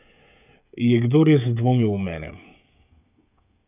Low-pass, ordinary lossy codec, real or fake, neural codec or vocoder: 3.6 kHz; none; fake; codec, 16 kHz, 16 kbps, FreqCodec, smaller model